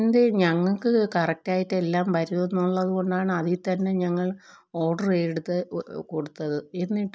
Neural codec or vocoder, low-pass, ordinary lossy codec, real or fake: none; none; none; real